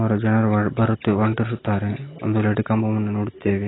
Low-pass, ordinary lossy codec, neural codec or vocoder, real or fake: 7.2 kHz; AAC, 16 kbps; none; real